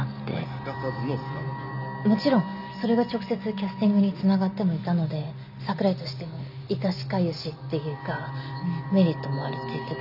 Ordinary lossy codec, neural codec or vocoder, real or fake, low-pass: none; none; real; 5.4 kHz